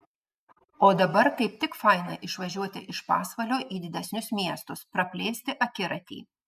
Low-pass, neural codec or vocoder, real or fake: 14.4 kHz; none; real